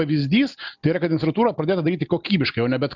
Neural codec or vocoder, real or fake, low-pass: none; real; 7.2 kHz